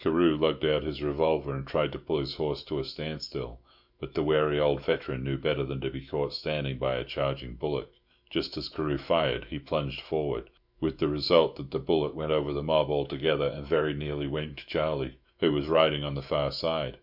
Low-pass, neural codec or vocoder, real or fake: 5.4 kHz; autoencoder, 48 kHz, 128 numbers a frame, DAC-VAE, trained on Japanese speech; fake